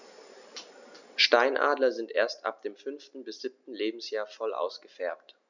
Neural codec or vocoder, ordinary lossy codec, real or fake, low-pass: none; none; real; 7.2 kHz